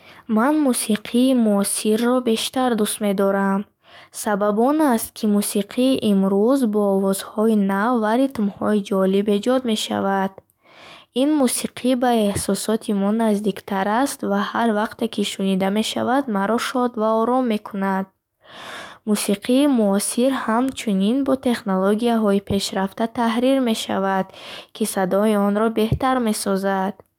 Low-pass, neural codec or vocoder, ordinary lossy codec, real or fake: 19.8 kHz; codec, 44.1 kHz, 7.8 kbps, DAC; none; fake